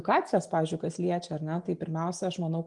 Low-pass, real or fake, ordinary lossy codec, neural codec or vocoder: 10.8 kHz; real; Opus, 32 kbps; none